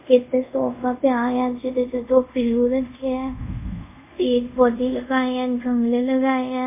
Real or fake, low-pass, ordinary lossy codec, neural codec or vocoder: fake; 3.6 kHz; MP3, 24 kbps; codec, 24 kHz, 0.5 kbps, DualCodec